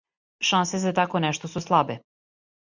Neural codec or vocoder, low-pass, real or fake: none; 7.2 kHz; real